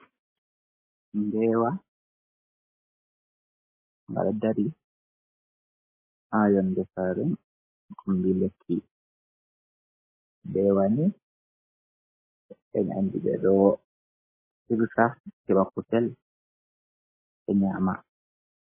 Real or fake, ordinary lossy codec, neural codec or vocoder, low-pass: real; MP3, 16 kbps; none; 3.6 kHz